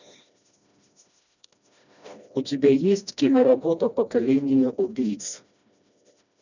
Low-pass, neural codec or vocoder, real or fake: 7.2 kHz; codec, 16 kHz, 1 kbps, FreqCodec, smaller model; fake